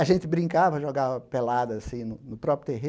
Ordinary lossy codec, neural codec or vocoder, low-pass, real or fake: none; none; none; real